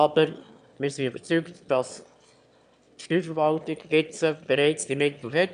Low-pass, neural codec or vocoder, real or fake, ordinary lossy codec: 9.9 kHz; autoencoder, 22.05 kHz, a latent of 192 numbers a frame, VITS, trained on one speaker; fake; none